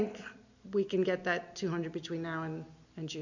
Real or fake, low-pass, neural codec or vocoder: real; 7.2 kHz; none